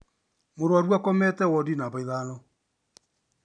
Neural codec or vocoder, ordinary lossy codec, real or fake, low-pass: none; none; real; 9.9 kHz